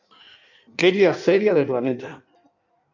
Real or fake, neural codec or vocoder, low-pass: fake; codec, 16 kHz in and 24 kHz out, 1.1 kbps, FireRedTTS-2 codec; 7.2 kHz